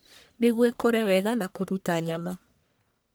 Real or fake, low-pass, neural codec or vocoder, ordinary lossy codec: fake; none; codec, 44.1 kHz, 1.7 kbps, Pupu-Codec; none